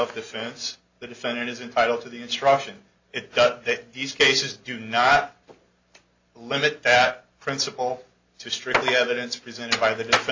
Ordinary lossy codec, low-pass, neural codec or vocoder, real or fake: AAC, 48 kbps; 7.2 kHz; none; real